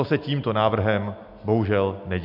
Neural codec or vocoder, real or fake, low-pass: none; real; 5.4 kHz